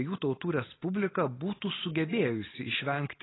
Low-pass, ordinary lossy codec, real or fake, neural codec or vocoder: 7.2 kHz; AAC, 16 kbps; real; none